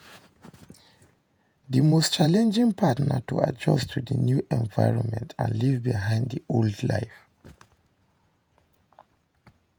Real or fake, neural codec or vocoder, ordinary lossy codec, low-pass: fake; vocoder, 48 kHz, 128 mel bands, Vocos; none; none